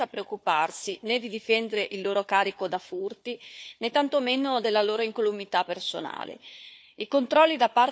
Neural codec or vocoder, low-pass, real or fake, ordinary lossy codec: codec, 16 kHz, 4 kbps, FunCodec, trained on Chinese and English, 50 frames a second; none; fake; none